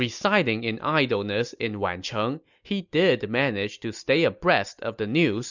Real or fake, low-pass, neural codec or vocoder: real; 7.2 kHz; none